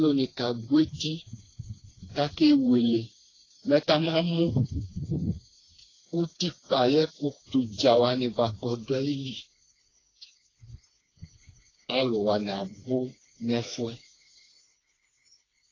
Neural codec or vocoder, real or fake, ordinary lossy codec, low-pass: codec, 16 kHz, 2 kbps, FreqCodec, smaller model; fake; AAC, 32 kbps; 7.2 kHz